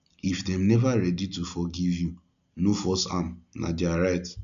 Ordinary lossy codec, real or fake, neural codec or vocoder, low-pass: none; real; none; 7.2 kHz